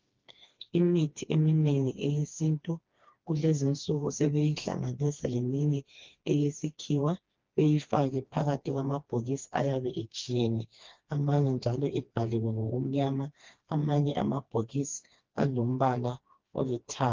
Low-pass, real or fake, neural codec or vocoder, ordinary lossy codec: 7.2 kHz; fake; codec, 16 kHz, 2 kbps, FreqCodec, smaller model; Opus, 32 kbps